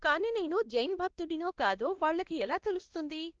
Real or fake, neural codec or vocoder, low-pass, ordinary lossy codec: fake; codec, 16 kHz, 1 kbps, X-Codec, WavLM features, trained on Multilingual LibriSpeech; 7.2 kHz; Opus, 16 kbps